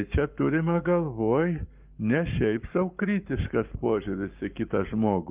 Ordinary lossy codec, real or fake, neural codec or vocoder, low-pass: Opus, 32 kbps; fake; codec, 44.1 kHz, 7.8 kbps, Pupu-Codec; 3.6 kHz